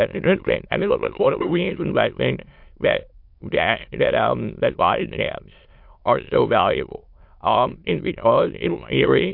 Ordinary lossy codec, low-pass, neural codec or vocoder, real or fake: MP3, 48 kbps; 5.4 kHz; autoencoder, 22.05 kHz, a latent of 192 numbers a frame, VITS, trained on many speakers; fake